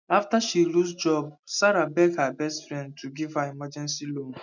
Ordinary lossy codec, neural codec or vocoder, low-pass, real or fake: none; none; 7.2 kHz; real